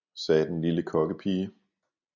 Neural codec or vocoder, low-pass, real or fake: none; 7.2 kHz; real